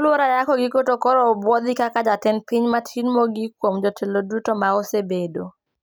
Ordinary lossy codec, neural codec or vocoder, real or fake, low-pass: none; none; real; none